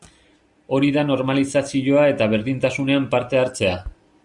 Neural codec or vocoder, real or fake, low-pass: none; real; 10.8 kHz